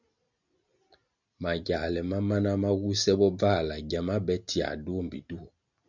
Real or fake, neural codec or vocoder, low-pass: real; none; 7.2 kHz